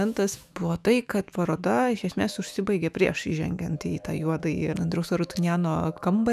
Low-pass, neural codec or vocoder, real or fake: 14.4 kHz; autoencoder, 48 kHz, 128 numbers a frame, DAC-VAE, trained on Japanese speech; fake